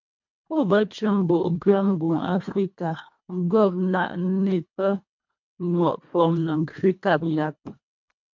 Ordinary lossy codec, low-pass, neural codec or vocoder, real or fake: MP3, 48 kbps; 7.2 kHz; codec, 24 kHz, 1.5 kbps, HILCodec; fake